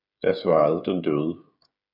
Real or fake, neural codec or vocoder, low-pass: fake; codec, 16 kHz, 8 kbps, FreqCodec, smaller model; 5.4 kHz